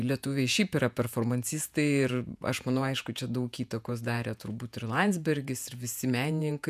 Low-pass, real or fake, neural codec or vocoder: 14.4 kHz; fake; vocoder, 48 kHz, 128 mel bands, Vocos